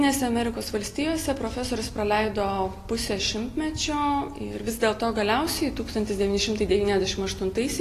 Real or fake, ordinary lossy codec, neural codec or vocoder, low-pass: real; AAC, 48 kbps; none; 14.4 kHz